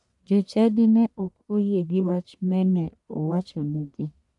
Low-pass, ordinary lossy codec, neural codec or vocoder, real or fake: 10.8 kHz; none; codec, 44.1 kHz, 1.7 kbps, Pupu-Codec; fake